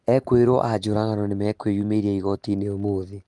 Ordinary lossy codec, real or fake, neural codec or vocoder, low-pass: Opus, 24 kbps; real; none; 10.8 kHz